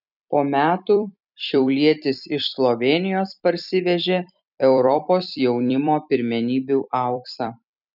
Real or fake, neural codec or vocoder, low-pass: fake; vocoder, 44.1 kHz, 128 mel bands every 256 samples, BigVGAN v2; 5.4 kHz